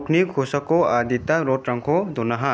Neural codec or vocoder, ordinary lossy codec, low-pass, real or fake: none; none; none; real